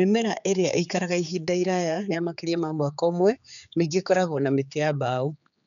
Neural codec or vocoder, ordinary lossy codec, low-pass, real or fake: codec, 16 kHz, 4 kbps, X-Codec, HuBERT features, trained on general audio; none; 7.2 kHz; fake